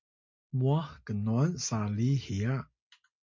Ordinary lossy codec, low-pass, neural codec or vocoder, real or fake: MP3, 48 kbps; 7.2 kHz; none; real